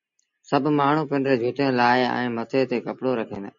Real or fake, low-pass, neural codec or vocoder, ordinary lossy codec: real; 7.2 kHz; none; MP3, 48 kbps